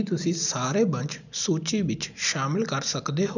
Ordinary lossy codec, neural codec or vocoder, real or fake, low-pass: none; none; real; 7.2 kHz